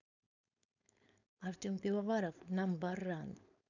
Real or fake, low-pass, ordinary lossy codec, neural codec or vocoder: fake; 7.2 kHz; none; codec, 16 kHz, 4.8 kbps, FACodec